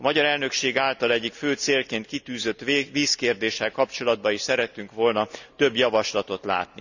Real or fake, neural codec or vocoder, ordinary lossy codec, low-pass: real; none; none; 7.2 kHz